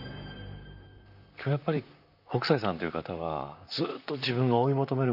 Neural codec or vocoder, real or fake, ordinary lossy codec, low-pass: vocoder, 44.1 kHz, 128 mel bands, Pupu-Vocoder; fake; none; 5.4 kHz